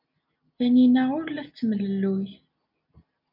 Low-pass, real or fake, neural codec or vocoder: 5.4 kHz; real; none